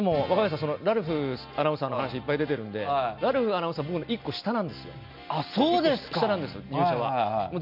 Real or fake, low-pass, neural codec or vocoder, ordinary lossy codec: real; 5.4 kHz; none; none